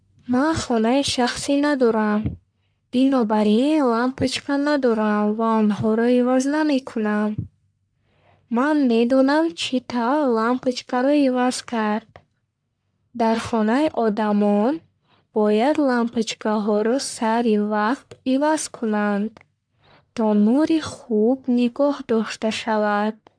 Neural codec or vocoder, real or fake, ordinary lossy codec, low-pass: codec, 44.1 kHz, 1.7 kbps, Pupu-Codec; fake; MP3, 96 kbps; 9.9 kHz